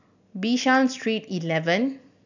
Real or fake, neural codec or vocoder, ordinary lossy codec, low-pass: real; none; none; 7.2 kHz